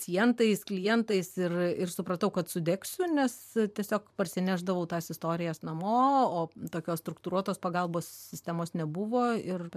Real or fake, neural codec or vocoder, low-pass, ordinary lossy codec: fake; vocoder, 44.1 kHz, 128 mel bands every 512 samples, BigVGAN v2; 14.4 kHz; MP3, 96 kbps